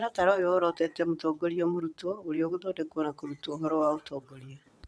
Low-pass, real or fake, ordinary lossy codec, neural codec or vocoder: none; fake; none; vocoder, 22.05 kHz, 80 mel bands, WaveNeXt